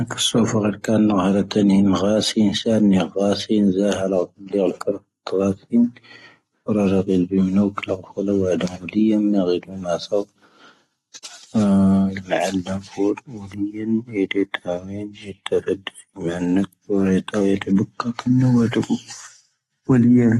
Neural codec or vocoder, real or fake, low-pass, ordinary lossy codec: none; real; 19.8 kHz; AAC, 32 kbps